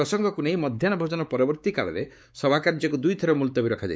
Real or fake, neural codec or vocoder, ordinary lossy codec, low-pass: fake; codec, 16 kHz, 4 kbps, X-Codec, WavLM features, trained on Multilingual LibriSpeech; none; none